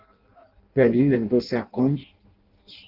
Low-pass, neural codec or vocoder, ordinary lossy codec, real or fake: 5.4 kHz; codec, 16 kHz in and 24 kHz out, 0.6 kbps, FireRedTTS-2 codec; Opus, 16 kbps; fake